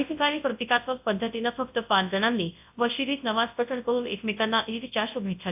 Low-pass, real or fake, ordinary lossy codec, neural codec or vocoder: 3.6 kHz; fake; AAC, 32 kbps; codec, 24 kHz, 0.9 kbps, WavTokenizer, large speech release